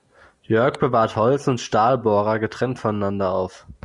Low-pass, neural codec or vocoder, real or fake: 10.8 kHz; none; real